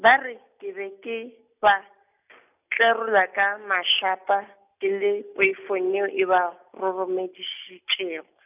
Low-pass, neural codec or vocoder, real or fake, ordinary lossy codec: 3.6 kHz; none; real; none